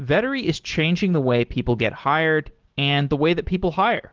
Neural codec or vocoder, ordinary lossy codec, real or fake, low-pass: none; Opus, 16 kbps; real; 7.2 kHz